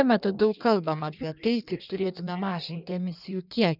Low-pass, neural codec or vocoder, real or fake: 5.4 kHz; codec, 16 kHz in and 24 kHz out, 1.1 kbps, FireRedTTS-2 codec; fake